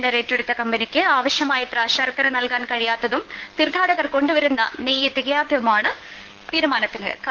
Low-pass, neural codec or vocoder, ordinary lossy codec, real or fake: 7.2 kHz; codec, 16 kHz, 6 kbps, DAC; Opus, 16 kbps; fake